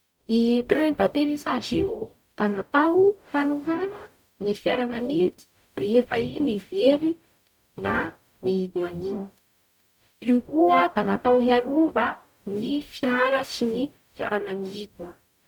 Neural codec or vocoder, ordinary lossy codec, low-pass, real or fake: codec, 44.1 kHz, 0.9 kbps, DAC; none; none; fake